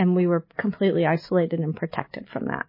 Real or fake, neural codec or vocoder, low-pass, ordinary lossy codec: real; none; 5.4 kHz; MP3, 24 kbps